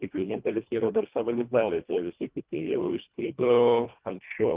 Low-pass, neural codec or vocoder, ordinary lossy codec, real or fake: 3.6 kHz; codec, 24 kHz, 1.5 kbps, HILCodec; Opus, 32 kbps; fake